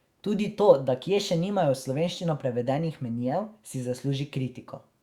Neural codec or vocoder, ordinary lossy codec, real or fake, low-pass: autoencoder, 48 kHz, 128 numbers a frame, DAC-VAE, trained on Japanese speech; Opus, 64 kbps; fake; 19.8 kHz